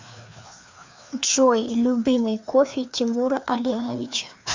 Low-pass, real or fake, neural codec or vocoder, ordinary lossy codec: 7.2 kHz; fake; codec, 16 kHz, 2 kbps, FreqCodec, larger model; MP3, 64 kbps